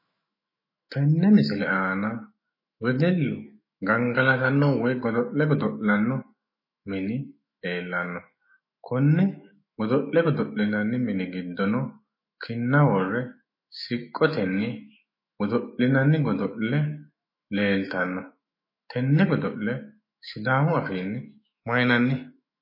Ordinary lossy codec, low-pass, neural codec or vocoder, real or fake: MP3, 24 kbps; 5.4 kHz; autoencoder, 48 kHz, 128 numbers a frame, DAC-VAE, trained on Japanese speech; fake